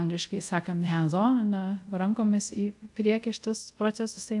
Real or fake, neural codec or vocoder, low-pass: fake; codec, 24 kHz, 0.5 kbps, DualCodec; 10.8 kHz